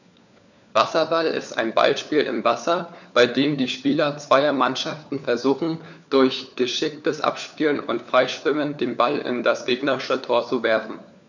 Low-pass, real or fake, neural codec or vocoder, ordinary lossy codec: 7.2 kHz; fake; codec, 16 kHz, 4 kbps, FunCodec, trained on LibriTTS, 50 frames a second; none